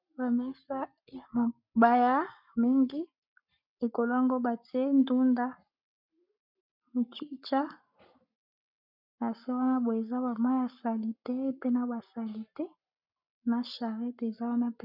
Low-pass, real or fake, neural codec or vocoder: 5.4 kHz; fake; codec, 44.1 kHz, 7.8 kbps, Pupu-Codec